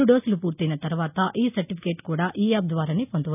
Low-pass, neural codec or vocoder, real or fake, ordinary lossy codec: 3.6 kHz; none; real; none